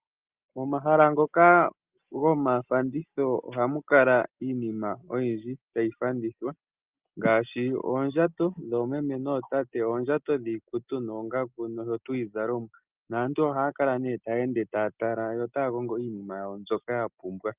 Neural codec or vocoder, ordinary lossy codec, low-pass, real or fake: none; Opus, 32 kbps; 3.6 kHz; real